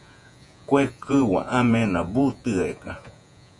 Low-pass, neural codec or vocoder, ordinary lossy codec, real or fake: 10.8 kHz; vocoder, 48 kHz, 128 mel bands, Vocos; MP3, 96 kbps; fake